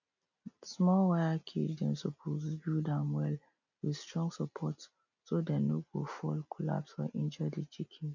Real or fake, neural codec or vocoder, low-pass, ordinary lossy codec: real; none; 7.2 kHz; none